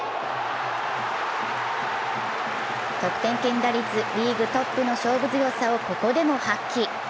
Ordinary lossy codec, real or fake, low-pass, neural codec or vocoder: none; real; none; none